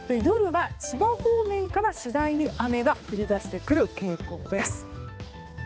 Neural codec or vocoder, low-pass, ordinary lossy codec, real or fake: codec, 16 kHz, 2 kbps, X-Codec, HuBERT features, trained on balanced general audio; none; none; fake